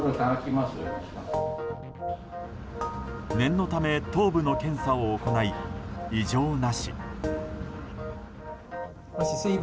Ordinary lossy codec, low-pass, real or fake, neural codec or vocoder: none; none; real; none